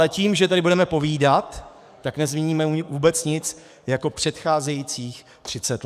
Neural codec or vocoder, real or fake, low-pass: codec, 44.1 kHz, 7.8 kbps, DAC; fake; 14.4 kHz